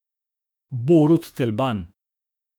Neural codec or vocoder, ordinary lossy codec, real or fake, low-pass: autoencoder, 48 kHz, 32 numbers a frame, DAC-VAE, trained on Japanese speech; none; fake; 19.8 kHz